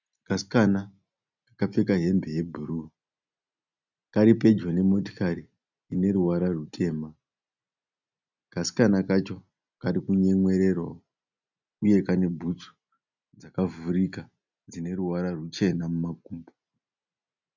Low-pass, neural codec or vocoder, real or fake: 7.2 kHz; none; real